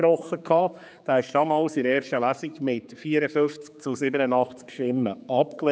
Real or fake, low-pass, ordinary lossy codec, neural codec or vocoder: fake; none; none; codec, 16 kHz, 4 kbps, X-Codec, HuBERT features, trained on general audio